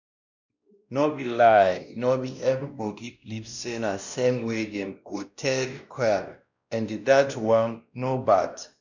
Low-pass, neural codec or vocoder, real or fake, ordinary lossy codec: 7.2 kHz; codec, 16 kHz, 1 kbps, X-Codec, WavLM features, trained on Multilingual LibriSpeech; fake; none